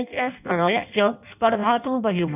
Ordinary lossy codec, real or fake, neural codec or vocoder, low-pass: none; fake; codec, 16 kHz in and 24 kHz out, 0.6 kbps, FireRedTTS-2 codec; 3.6 kHz